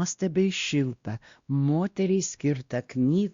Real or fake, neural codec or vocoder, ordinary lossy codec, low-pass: fake; codec, 16 kHz, 1 kbps, X-Codec, WavLM features, trained on Multilingual LibriSpeech; Opus, 64 kbps; 7.2 kHz